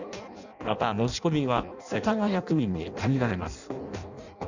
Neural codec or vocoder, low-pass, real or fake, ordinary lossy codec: codec, 16 kHz in and 24 kHz out, 0.6 kbps, FireRedTTS-2 codec; 7.2 kHz; fake; none